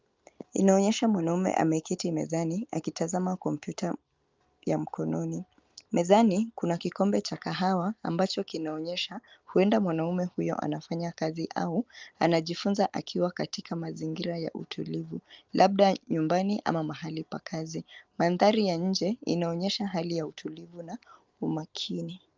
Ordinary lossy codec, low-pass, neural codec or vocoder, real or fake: Opus, 24 kbps; 7.2 kHz; none; real